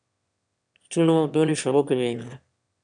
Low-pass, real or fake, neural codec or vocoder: 9.9 kHz; fake; autoencoder, 22.05 kHz, a latent of 192 numbers a frame, VITS, trained on one speaker